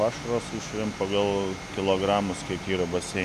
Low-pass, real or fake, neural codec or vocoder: 14.4 kHz; real; none